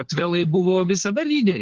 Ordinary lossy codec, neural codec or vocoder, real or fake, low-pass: Opus, 16 kbps; codec, 16 kHz, 4 kbps, FunCodec, trained on LibriTTS, 50 frames a second; fake; 7.2 kHz